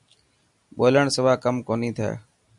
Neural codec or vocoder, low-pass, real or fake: none; 10.8 kHz; real